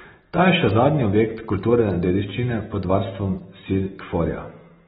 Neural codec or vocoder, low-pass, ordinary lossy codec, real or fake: none; 14.4 kHz; AAC, 16 kbps; real